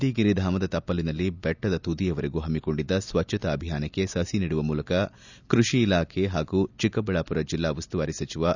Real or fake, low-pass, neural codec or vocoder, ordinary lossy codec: real; 7.2 kHz; none; none